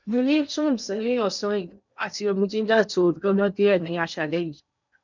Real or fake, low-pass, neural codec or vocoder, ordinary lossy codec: fake; 7.2 kHz; codec, 16 kHz in and 24 kHz out, 0.8 kbps, FocalCodec, streaming, 65536 codes; none